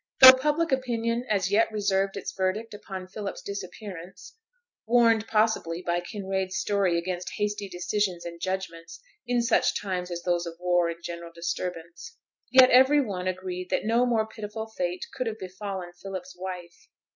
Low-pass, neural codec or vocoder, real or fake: 7.2 kHz; none; real